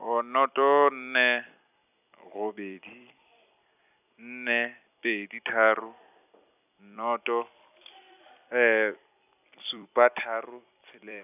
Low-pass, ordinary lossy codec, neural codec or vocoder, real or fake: 3.6 kHz; none; vocoder, 44.1 kHz, 128 mel bands every 256 samples, BigVGAN v2; fake